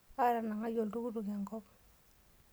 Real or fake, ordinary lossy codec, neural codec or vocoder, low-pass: real; none; none; none